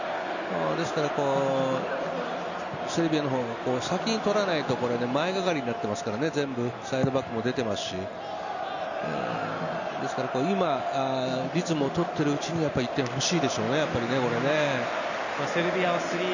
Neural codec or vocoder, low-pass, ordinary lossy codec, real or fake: none; 7.2 kHz; none; real